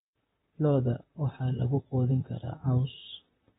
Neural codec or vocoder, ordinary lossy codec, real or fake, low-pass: vocoder, 44.1 kHz, 128 mel bands, Pupu-Vocoder; AAC, 16 kbps; fake; 19.8 kHz